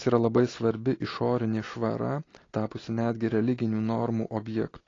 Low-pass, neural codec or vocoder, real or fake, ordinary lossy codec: 7.2 kHz; none; real; AAC, 32 kbps